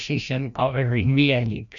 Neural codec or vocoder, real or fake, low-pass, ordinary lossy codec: codec, 16 kHz, 1 kbps, FreqCodec, larger model; fake; 7.2 kHz; none